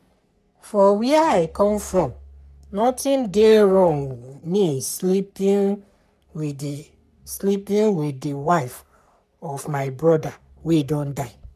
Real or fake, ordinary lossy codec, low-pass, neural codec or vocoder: fake; none; 14.4 kHz; codec, 44.1 kHz, 3.4 kbps, Pupu-Codec